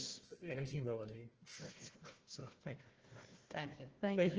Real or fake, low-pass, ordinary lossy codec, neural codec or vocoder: fake; 7.2 kHz; Opus, 16 kbps; codec, 16 kHz, 1 kbps, FunCodec, trained on Chinese and English, 50 frames a second